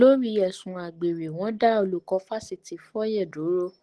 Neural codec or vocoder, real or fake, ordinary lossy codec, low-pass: none; real; Opus, 16 kbps; 10.8 kHz